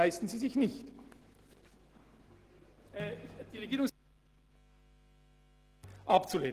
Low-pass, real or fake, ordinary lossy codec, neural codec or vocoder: 14.4 kHz; real; Opus, 16 kbps; none